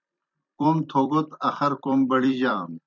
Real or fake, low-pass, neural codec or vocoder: fake; 7.2 kHz; vocoder, 44.1 kHz, 128 mel bands every 256 samples, BigVGAN v2